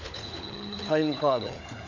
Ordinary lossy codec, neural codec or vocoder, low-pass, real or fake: none; codec, 16 kHz, 16 kbps, FunCodec, trained on LibriTTS, 50 frames a second; 7.2 kHz; fake